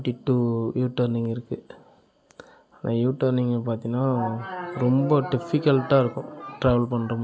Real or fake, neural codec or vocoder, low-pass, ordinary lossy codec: real; none; none; none